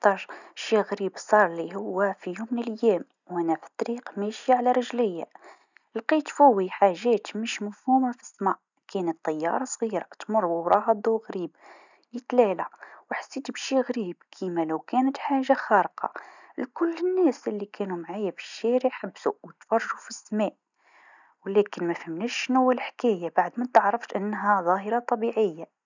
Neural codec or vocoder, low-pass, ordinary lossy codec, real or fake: none; 7.2 kHz; none; real